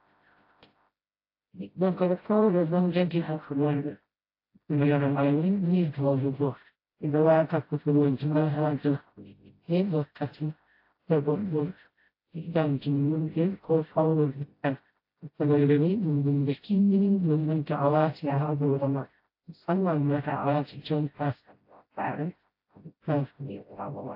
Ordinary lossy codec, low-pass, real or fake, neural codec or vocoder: AAC, 32 kbps; 5.4 kHz; fake; codec, 16 kHz, 0.5 kbps, FreqCodec, smaller model